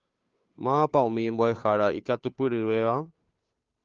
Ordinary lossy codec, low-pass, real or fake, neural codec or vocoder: Opus, 32 kbps; 7.2 kHz; fake; codec, 16 kHz, 2 kbps, FunCodec, trained on Chinese and English, 25 frames a second